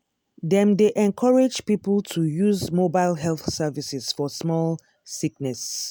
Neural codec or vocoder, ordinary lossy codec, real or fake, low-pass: none; none; real; none